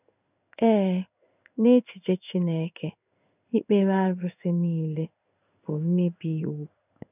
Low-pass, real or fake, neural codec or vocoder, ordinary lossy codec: 3.6 kHz; fake; codec, 16 kHz in and 24 kHz out, 1 kbps, XY-Tokenizer; none